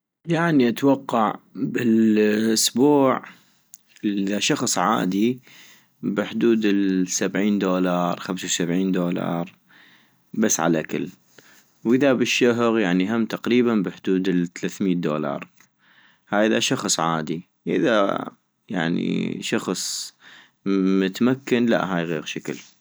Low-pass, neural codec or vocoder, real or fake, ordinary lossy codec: none; none; real; none